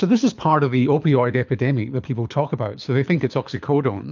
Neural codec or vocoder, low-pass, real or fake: codec, 24 kHz, 6 kbps, HILCodec; 7.2 kHz; fake